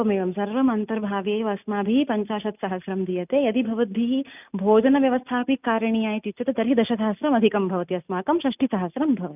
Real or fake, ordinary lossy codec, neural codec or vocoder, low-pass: real; none; none; 3.6 kHz